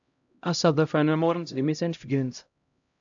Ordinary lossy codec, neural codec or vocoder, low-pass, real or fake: AAC, 64 kbps; codec, 16 kHz, 0.5 kbps, X-Codec, HuBERT features, trained on LibriSpeech; 7.2 kHz; fake